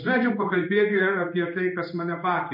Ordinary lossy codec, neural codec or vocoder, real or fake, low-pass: MP3, 32 kbps; codec, 16 kHz in and 24 kHz out, 1 kbps, XY-Tokenizer; fake; 5.4 kHz